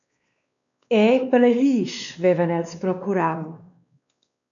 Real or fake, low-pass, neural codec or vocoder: fake; 7.2 kHz; codec, 16 kHz, 2 kbps, X-Codec, WavLM features, trained on Multilingual LibriSpeech